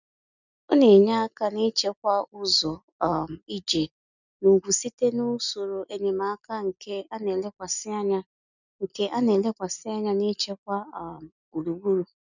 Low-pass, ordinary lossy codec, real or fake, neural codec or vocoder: 7.2 kHz; none; real; none